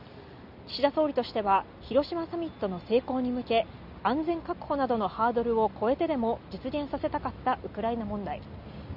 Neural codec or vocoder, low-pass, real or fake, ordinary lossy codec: none; 5.4 kHz; real; none